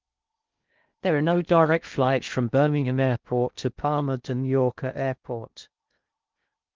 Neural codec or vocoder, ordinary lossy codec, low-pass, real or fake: codec, 16 kHz in and 24 kHz out, 0.6 kbps, FocalCodec, streaming, 4096 codes; Opus, 32 kbps; 7.2 kHz; fake